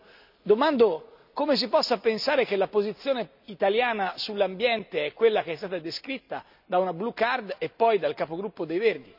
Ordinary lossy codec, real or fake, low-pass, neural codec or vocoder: none; real; 5.4 kHz; none